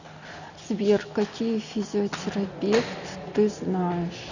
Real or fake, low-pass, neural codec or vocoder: real; 7.2 kHz; none